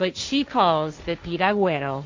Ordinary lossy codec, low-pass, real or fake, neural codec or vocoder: MP3, 48 kbps; 7.2 kHz; fake; codec, 16 kHz, 1.1 kbps, Voila-Tokenizer